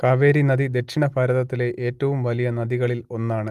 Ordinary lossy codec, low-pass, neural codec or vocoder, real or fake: Opus, 24 kbps; 14.4 kHz; none; real